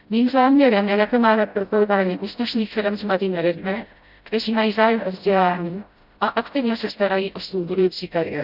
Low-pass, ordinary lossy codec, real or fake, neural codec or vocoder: 5.4 kHz; Opus, 64 kbps; fake; codec, 16 kHz, 0.5 kbps, FreqCodec, smaller model